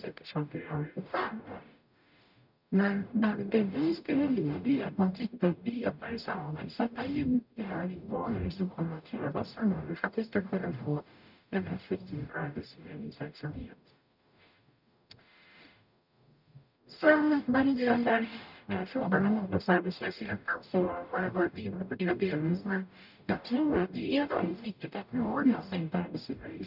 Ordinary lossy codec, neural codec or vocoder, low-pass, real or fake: none; codec, 44.1 kHz, 0.9 kbps, DAC; 5.4 kHz; fake